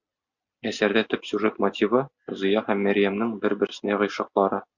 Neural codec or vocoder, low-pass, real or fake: none; 7.2 kHz; real